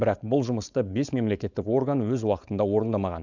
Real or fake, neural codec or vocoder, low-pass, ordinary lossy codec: fake; codec, 16 kHz, 4.8 kbps, FACodec; 7.2 kHz; none